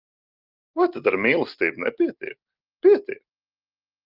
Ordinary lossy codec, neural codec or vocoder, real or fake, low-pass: Opus, 24 kbps; none; real; 5.4 kHz